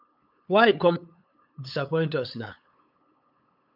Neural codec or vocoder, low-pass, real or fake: codec, 16 kHz, 8 kbps, FunCodec, trained on LibriTTS, 25 frames a second; 5.4 kHz; fake